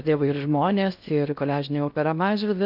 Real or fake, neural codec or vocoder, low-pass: fake; codec, 16 kHz in and 24 kHz out, 0.6 kbps, FocalCodec, streaming, 4096 codes; 5.4 kHz